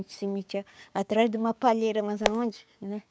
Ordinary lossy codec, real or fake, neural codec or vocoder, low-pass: none; fake; codec, 16 kHz, 6 kbps, DAC; none